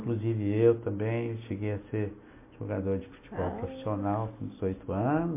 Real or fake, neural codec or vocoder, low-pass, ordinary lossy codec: real; none; 3.6 kHz; MP3, 24 kbps